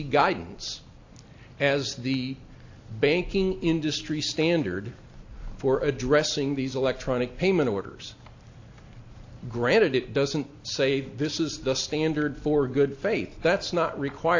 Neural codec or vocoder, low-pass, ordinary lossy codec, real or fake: none; 7.2 kHz; AAC, 48 kbps; real